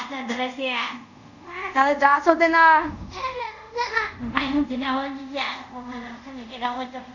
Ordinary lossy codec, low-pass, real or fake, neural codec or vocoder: none; 7.2 kHz; fake; codec, 24 kHz, 0.5 kbps, DualCodec